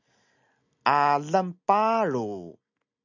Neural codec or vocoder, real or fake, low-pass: none; real; 7.2 kHz